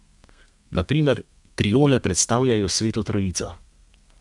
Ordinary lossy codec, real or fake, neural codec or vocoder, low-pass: none; fake; codec, 32 kHz, 1.9 kbps, SNAC; 10.8 kHz